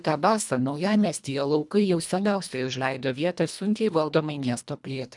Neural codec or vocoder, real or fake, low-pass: codec, 24 kHz, 1.5 kbps, HILCodec; fake; 10.8 kHz